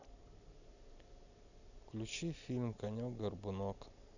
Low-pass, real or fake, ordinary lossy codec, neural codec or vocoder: 7.2 kHz; real; none; none